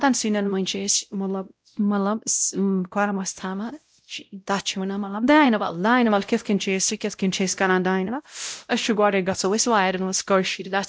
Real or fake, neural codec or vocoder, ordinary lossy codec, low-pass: fake; codec, 16 kHz, 0.5 kbps, X-Codec, WavLM features, trained on Multilingual LibriSpeech; none; none